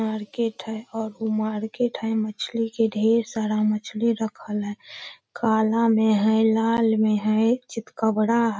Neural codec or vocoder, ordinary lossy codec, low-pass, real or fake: none; none; none; real